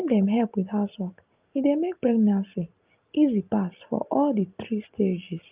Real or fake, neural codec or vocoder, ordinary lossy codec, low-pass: real; none; Opus, 24 kbps; 3.6 kHz